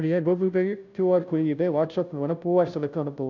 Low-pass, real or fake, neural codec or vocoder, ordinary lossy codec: 7.2 kHz; fake; codec, 16 kHz, 0.5 kbps, FunCodec, trained on Chinese and English, 25 frames a second; none